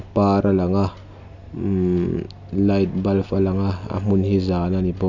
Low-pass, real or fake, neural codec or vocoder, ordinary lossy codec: 7.2 kHz; real; none; none